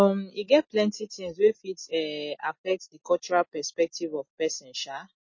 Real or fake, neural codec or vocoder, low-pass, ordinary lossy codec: real; none; 7.2 kHz; MP3, 32 kbps